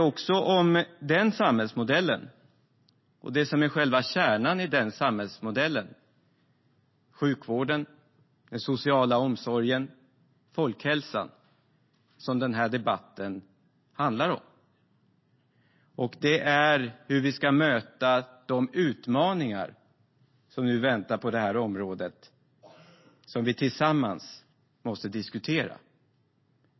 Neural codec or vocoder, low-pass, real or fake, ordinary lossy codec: none; 7.2 kHz; real; MP3, 24 kbps